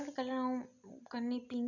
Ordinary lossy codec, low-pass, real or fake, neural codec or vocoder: none; 7.2 kHz; real; none